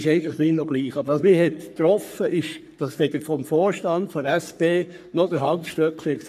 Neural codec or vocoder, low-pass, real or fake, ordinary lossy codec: codec, 44.1 kHz, 3.4 kbps, Pupu-Codec; 14.4 kHz; fake; none